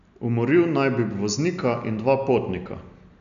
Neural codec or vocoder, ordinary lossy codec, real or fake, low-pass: none; none; real; 7.2 kHz